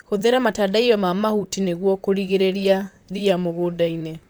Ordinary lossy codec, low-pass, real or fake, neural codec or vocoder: none; none; fake; vocoder, 44.1 kHz, 128 mel bands, Pupu-Vocoder